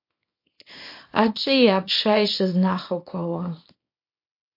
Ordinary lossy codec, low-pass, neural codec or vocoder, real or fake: MP3, 32 kbps; 5.4 kHz; codec, 24 kHz, 0.9 kbps, WavTokenizer, small release; fake